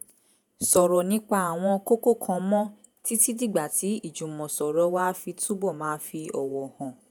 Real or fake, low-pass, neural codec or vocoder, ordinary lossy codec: fake; none; vocoder, 48 kHz, 128 mel bands, Vocos; none